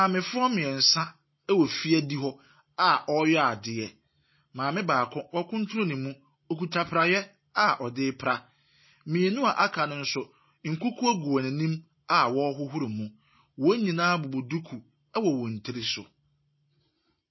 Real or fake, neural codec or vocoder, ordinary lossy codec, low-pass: real; none; MP3, 24 kbps; 7.2 kHz